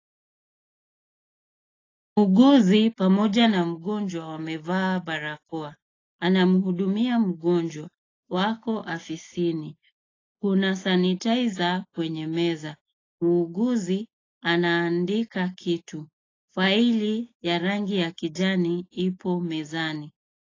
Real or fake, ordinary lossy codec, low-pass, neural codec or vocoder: real; AAC, 32 kbps; 7.2 kHz; none